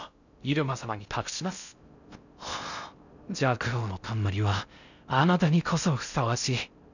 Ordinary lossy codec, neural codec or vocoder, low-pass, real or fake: none; codec, 16 kHz in and 24 kHz out, 0.6 kbps, FocalCodec, streaming, 2048 codes; 7.2 kHz; fake